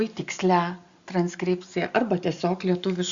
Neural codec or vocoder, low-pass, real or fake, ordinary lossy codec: none; 7.2 kHz; real; Opus, 64 kbps